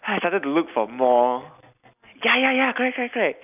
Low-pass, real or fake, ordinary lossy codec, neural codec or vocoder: 3.6 kHz; real; none; none